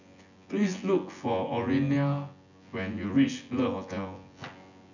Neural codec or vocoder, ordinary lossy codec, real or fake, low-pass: vocoder, 24 kHz, 100 mel bands, Vocos; none; fake; 7.2 kHz